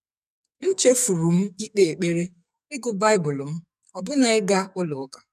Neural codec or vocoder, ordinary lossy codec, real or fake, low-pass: codec, 44.1 kHz, 2.6 kbps, SNAC; none; fake; 14.4 kHz